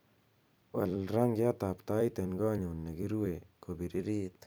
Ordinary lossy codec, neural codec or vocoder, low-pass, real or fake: none; vocoder, 44.1 kHz, 128 mel bands every 256 samples, BigVGAN v2; none; fake